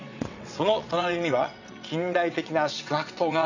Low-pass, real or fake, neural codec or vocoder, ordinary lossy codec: 7.2 kHz; fake; vocoder, 22.05 kHz, 80 mel bands, WaveNeXt; none